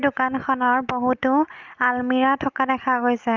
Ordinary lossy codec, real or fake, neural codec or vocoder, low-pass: Opus, 24 kbps; real; none; 7.2 kHz